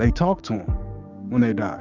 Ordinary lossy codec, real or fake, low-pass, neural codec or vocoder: Opus, 64 kbps; fake; 7.2 kHz; codec, 16 kHz, 6 kbps, DAC